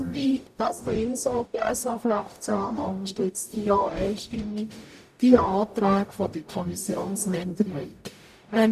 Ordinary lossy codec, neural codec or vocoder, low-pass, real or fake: none; codec, 44.1 kHz, 0.9 kbps, DAC; 14.4 kHz; fake